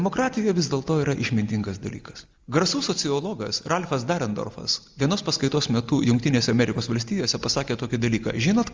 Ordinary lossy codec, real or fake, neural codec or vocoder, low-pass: Opus, 32 kbps; real; none; 7.2 kHz